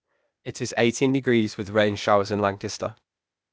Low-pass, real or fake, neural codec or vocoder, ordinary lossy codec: none; fake; codec, 16 kHz, 0.8 kbps, ZipCodec; none